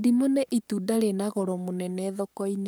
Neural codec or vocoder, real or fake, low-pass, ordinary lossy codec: codec, 44.1 kHz, 7.8 kbps, Pupu-Codec; fake; none; none